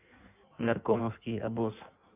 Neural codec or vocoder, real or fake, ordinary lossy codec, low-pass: codec, 16 kHz in and 24 kHz out, 1.1 kbps, FireRedTTS-2 codec; fake; none; 3.6 kHz